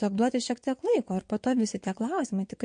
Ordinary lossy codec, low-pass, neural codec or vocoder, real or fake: MP3, 48 kbps; 9.9 kHz; vocoder, 22.05 kHz, 80 mel bands, WaveNeXt; fake